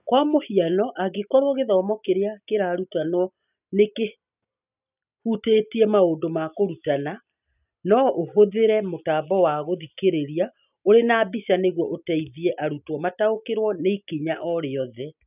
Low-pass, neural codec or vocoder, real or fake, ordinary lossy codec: 3.6 kHz; none; real; none